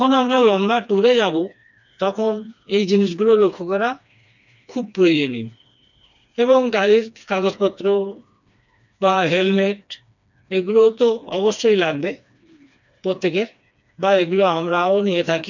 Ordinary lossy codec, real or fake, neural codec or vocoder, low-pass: none; fake; codec, 16 kHz, 2 kbps, FreqCodec, smaller model; 7.2 kHz